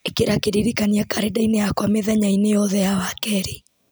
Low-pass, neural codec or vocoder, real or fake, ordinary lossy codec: none; none; real; none